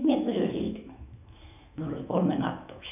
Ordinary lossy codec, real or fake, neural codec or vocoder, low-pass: none; fake; autoencoder, 48 kHz, 128 numbers a frame, DAC-VAE, trained on Japanese speech; 3.6 kHz